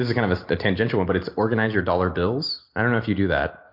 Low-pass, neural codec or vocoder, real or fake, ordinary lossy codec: 5.4 kHz; none; real; MP3, 48 kbps